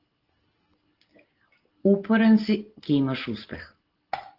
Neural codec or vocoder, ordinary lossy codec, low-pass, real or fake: none; Opus, 16 kbps; 5.4 kHz; real